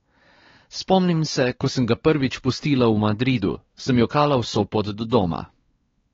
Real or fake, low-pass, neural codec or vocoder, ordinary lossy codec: fake; 7.2 kHz; codec, 16 kHz, 4 kbps, X-Codec, WavLM features, trained on Multilingual LibriSpeech; AAC, 24 kbps